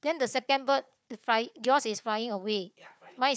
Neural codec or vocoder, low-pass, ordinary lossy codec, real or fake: codec, 16 kHz, 4 kbps, FunCodec, trained on Chinese and English, 50 frames a second; none; none; fake